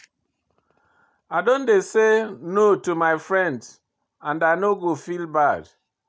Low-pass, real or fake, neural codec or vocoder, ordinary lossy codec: none; real; none; none